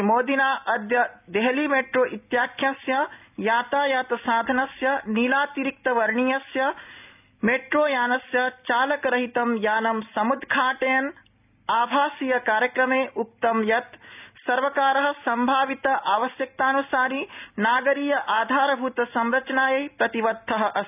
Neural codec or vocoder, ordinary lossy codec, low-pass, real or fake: none; none; 3.6 kHz; real